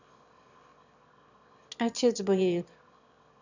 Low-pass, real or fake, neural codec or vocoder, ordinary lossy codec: 7.2 kHz; fake; autoencoder, 22.05 kHz, a latent of 192 numbers a frame, VITS, trained on one speaker; none